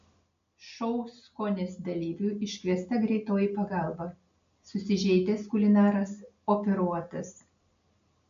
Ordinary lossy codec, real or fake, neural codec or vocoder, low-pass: MP3, 64 kbps; real; none; 7.2 kHz